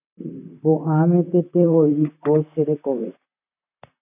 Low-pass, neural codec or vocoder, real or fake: 3.6 kHz; vocoder, 44.1 kHz, 128 mel bands, Pupu-Vocoder; fake